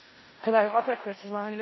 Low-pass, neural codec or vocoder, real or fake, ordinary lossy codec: 7.2 kHz; codec, 16 kHz in and 24 kHz out, 0.4 kbps, LongCat-Audio-Codec, four codebook decoder; fake; MP3, 24 kbps